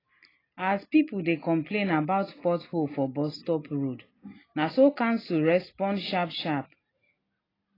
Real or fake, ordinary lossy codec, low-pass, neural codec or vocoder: real; AAC, 24 kbps; 5.4 kHz; none